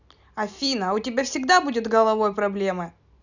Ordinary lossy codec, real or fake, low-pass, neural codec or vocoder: none; real; 7.2 kHz; none